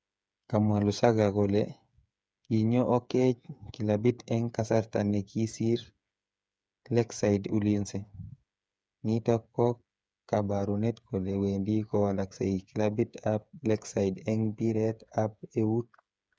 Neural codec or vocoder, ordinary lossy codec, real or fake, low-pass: codec, 16 kHz, 8 kbps, FreqCodec, smaller model; none; fake; none